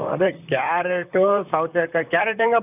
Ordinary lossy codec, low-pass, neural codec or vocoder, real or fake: none; 3.6 kHz; vocoder, 44.1 kHz, 128 mel bands, Pupu-Vocoder; fake